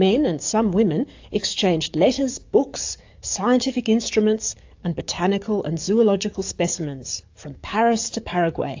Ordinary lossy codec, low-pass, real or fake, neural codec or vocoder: AAC, 48 kbps; 7.2 kHz; fake; codec, 44.1 kHz, 7.8 kbps, DAC